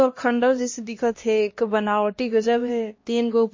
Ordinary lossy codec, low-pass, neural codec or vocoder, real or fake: MP3, 32 kbps; 7.2 kHz; codec, 16 kHz, 1 kbps, X-Codec, HuBERT features, trained on LibriSpeech; fake